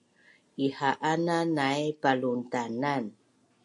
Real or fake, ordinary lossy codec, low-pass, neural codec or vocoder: real; MP3, 48 kbps; 10.8 kHz; none